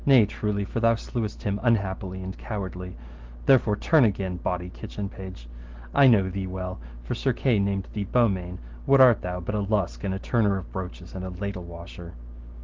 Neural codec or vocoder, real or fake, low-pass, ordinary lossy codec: none; real; 7.2 kHz; Opus, 16 kbps